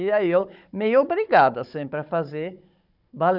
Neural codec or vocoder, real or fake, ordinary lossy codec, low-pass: codec, 16 kHz, 16 kbps, FunCodec, trained on Chinese and English, 50 frames a second; fake; none; 5.4 kHz